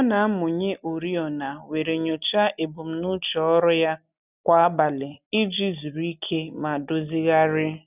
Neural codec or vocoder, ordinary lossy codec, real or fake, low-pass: none; none; real; 3.6 kHz